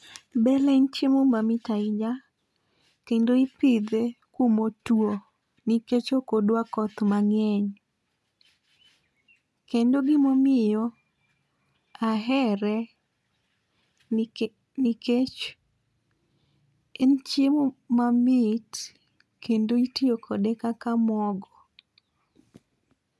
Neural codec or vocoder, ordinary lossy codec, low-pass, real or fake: none; none; none; real